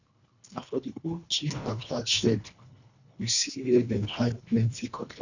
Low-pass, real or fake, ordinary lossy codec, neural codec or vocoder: 7.2 kHz; fake; none; codec, 24 kHz, 1.5 kbps, HILCodec